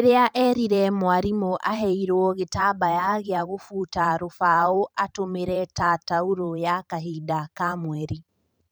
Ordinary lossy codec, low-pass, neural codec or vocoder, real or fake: none; none; vocoder, 44.1 kHz, 128 mel bands every 512 samples, BigVGAN v2; fake